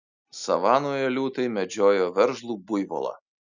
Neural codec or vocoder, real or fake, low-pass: none; real; 7.2 kHz